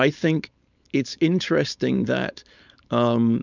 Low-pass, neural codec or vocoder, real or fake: 7.2 kHz; codec, 16 kHz, 4.8 kbps, FACodec; fake